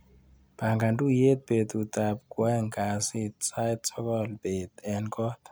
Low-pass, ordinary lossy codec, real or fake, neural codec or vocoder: none; none; real; none